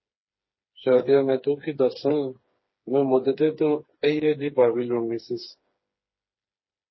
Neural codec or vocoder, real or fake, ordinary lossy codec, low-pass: codec, 16 kHz, 4 kbps, FreqCodec, smaller model; fake; MP3, 24 kbps; 7.2 kHz